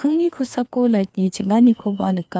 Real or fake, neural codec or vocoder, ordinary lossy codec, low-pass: fake; codec, 16 kHz, 2 kbps, FreqCodec, larger model; none; none